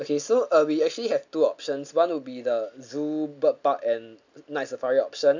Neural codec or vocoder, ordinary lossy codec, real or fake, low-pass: none; none; real; 7.2 kHz